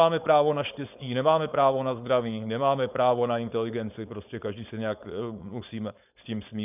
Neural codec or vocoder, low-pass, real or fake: codec, 16 kHz, 4.8 kbps, FACodec; 3.6 kHz; fake